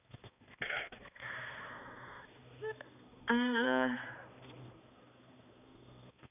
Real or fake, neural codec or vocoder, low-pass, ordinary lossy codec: fake; codec, 16 kHz, 2 kbps, X-Codec, HuBERT features, trained on balanced general audio; 3.6 kHz; none